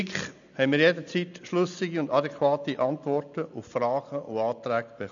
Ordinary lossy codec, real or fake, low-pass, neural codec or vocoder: none; real; 7.2 kHz; none